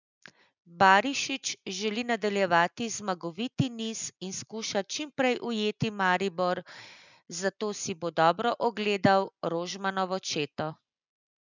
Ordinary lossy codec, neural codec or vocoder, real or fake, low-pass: none; none; real; 7.2 kHz